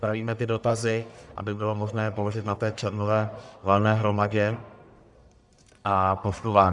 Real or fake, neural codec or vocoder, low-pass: fake; codec, 44.1 kHz, 1.7 kbps, Pupu-Codec; 10.8 kHz